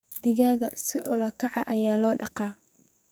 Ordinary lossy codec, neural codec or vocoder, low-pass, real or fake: none; codec, 44.1 kHz, 2.6 kbps, SNAC; none; fake